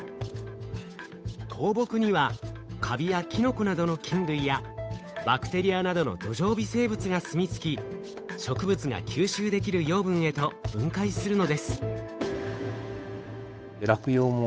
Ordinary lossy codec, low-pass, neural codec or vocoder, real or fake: none; none; codec, 16 kHz, 8 kbps, FunCodec, trained on Chinese and English, 25 frames a second; fake